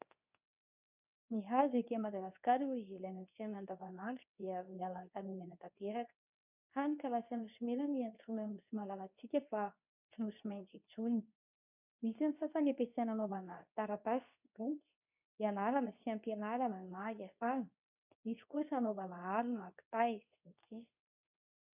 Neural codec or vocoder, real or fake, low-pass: codec, 24 kHz, 0.9 kbps, WavTokenizer, medium speech release version 2; fake; 3.6 kHz